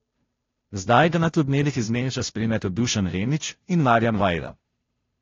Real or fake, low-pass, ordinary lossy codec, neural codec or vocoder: fake; 7.2 kHz; AAC, 32 kbps; codec, 16 kHz, 0.5 kbps, FunCodec, trained on Chinese and English, 25 frames a second